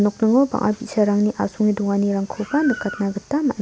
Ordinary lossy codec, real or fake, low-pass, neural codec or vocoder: none; real; none; none